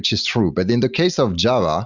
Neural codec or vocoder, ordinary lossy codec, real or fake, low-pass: none; Opus, 64 kbps; real; 7.2 kHz